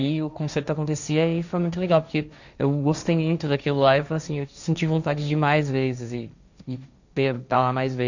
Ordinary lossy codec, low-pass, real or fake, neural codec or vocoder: none; 7.2 kHz; fake; codec, 16 kHz, 1.1 kbps, Voila-Tokenizer